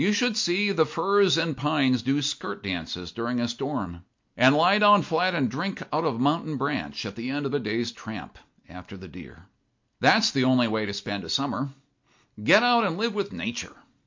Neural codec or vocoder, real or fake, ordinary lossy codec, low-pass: none; real; MP3, 48 kbps; 7.2 kHz